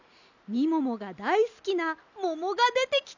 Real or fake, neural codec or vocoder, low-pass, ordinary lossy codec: real; none; 7.2 kHz; none